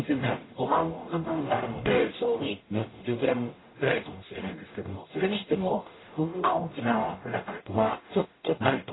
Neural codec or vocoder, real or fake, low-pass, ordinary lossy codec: codec, 44.1 kHz, 0.9 kbps, DAC; fake; 7.2 kHz; AAC, 16 kbps